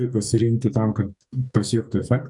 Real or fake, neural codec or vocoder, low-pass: fake; codec, 44.1 kHz, 2.6 kbps, SNAC; 10.8 kHz